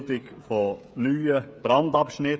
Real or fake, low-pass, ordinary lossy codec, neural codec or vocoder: fake; none; none; codec, 16 kHz, 16 kbps, FreqCodec, smaller model